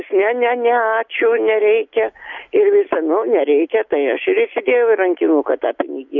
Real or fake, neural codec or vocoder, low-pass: real; none; 7.2 kHz